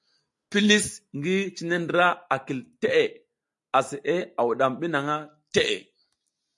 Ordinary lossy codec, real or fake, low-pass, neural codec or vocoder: MP3, 64 kbps; real; 10.8 kHz; none